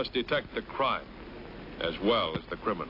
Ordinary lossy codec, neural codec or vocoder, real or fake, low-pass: AAC, 32 kbps; none; real; 5.4 kHz